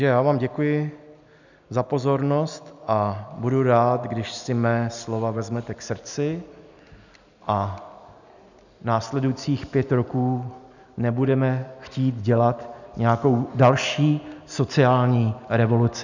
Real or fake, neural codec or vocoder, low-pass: real; none; 7.2 kHz